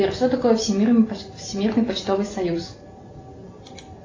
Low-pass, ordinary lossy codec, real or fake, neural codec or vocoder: 7.2 kHz; AAC, 32 kbps; real; none